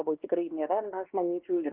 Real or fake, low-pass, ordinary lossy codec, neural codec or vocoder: fake; 3.6 kHz; Opus, 16 kbps; codec, 16 kHz, 2 kbps, X-Codec, WavLM features, trained on Multilingual LibriSpeech